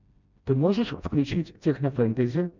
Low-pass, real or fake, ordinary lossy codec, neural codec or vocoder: 7.2 kHz; fake; MP3, 64 kbps; codec, 16 kHz, 1 kbps, FreqCodec, smaller model